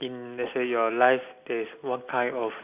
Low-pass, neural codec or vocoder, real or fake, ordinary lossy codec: 3.6 kHz; none; real; AAC, 32 kbps